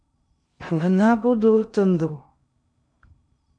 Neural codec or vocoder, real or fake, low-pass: codec, 16 kHz in and 24 kHz out, 0.6 kbps, FocalCodec, streaming, 2048 codes; fake; 9.9 kHz